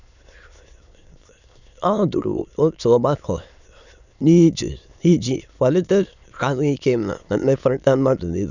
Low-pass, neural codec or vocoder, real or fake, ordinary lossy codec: 7.2 kHz; autoencoder, 22.05 kHz, a latent of 192 numbers a frame, VITS, trained on many speakers; fake; none